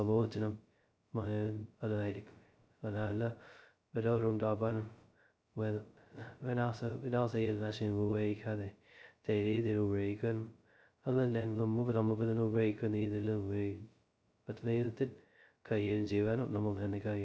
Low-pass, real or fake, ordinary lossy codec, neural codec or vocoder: none; fake; none; codec, 16 kHz, 0.2 kbps, FocalCodec